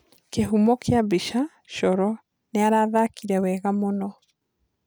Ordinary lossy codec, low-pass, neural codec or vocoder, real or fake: none; none; none; real